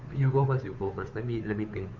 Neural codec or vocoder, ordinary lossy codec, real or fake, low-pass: codec, 16 kHz, 2 kbps, FunCodec, trained on Chinese and English, 25 frames a second; none; fake; 7.2 kHz